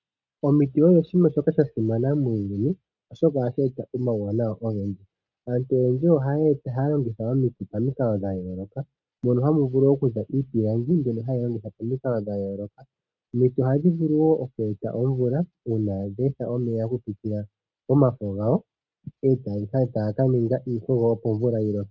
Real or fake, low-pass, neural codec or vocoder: real; 7.2 kHz; none